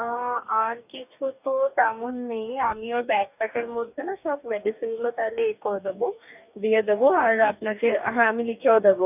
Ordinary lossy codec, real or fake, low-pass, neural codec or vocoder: none; fake; 3.6 kHz; codec, 44.1 kHz, 2.6 kbps, DAC